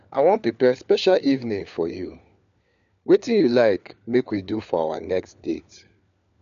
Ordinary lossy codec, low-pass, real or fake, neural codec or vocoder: AAC, 96 kbps; 7.2 kHz; fake; codec, 16 kHz, 4 kbps, FunCodec, trained on LibriTTS, 50 frames a second